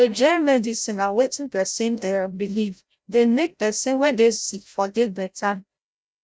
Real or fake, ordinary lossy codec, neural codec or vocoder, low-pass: fake; none; codec, 16 kHz, 0.5 kbps, FreqCodec, larger model; none